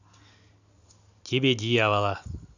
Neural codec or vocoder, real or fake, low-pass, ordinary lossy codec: none; real; 7.2 kHz; none